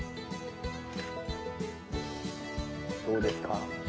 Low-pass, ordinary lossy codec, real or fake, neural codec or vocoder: none; none; real; none